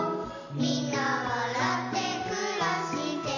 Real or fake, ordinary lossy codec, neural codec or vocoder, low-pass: real; none; none; 7.2 kHz